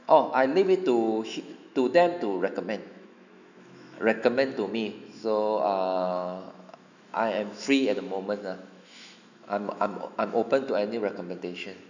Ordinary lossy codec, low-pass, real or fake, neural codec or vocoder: none; 7.2 kHz; fake; autoencoder, 48 kHz, 128 numbers a frame, DAC-VAE, trained on Japanese speech